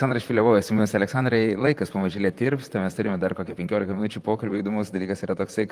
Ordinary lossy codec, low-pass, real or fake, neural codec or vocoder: Opus, 32 kbps; 14.4 kHz; fake; vocoder, 44.1 kHz, 128 mel bands, Pupu-Vocoder